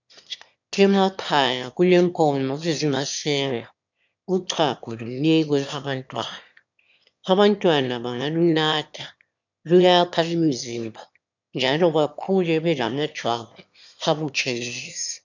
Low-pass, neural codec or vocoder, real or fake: 7.2 kHz; autoencoder, 22.05 kHz, a latent of 192 numbers a frame, VITS, trained on one speaker; fake